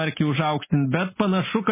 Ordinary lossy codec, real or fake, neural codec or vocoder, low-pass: MP3, 16 kbps; real; none; 3.6 kHz